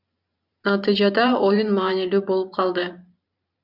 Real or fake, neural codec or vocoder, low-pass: fake; vocoder, 44.1 kHz, 128 mel bands every 512 samples, BigVGAN v2; 5.4 kHz